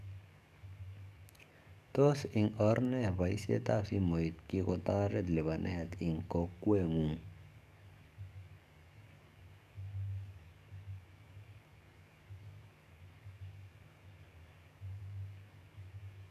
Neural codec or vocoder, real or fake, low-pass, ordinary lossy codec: codec, 44.1 kHz, 7.8 kbps, DAC; fake; 14.4 kHz; none